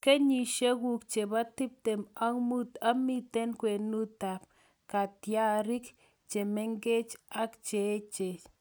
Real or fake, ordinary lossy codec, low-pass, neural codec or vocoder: real; none; none; none